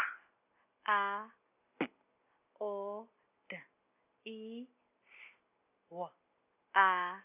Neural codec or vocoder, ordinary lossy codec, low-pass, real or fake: none; none; 3.6 kHz; real